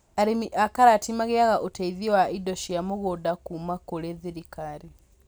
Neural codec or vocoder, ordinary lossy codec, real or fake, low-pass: none; none; real; none